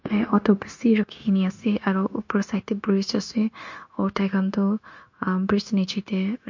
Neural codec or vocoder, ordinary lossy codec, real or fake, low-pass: codec, 16 kHz, 0.9 kbps, LongCat-Audio-Codec; MP3, 48 kbps; fake; 7.2 kHz